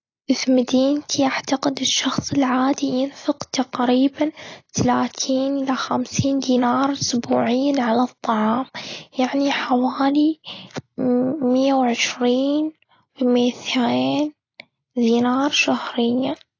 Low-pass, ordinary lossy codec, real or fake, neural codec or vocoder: 7.2 kHz; AAC, 32 kbps; real; none